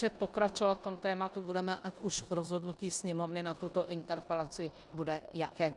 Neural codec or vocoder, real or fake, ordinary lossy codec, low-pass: codec, 16 kHz in and 24 kHz out, 0.9 kbps, LongCat-Audio-Codec, four codebook decoder; fake; Opus, 64 kbps; 10.8 kHz